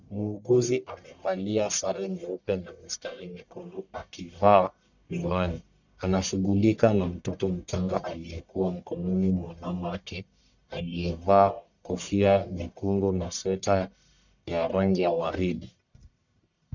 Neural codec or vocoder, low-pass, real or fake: codec, 44.1 kHz, 1.7 kbps, Pupu-Codec; 7.2 kHz; fake